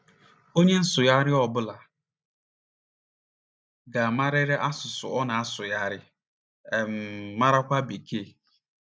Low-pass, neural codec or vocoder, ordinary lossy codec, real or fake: none; none; none; real